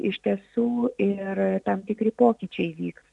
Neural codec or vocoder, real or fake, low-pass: none; real; 10.8 kHz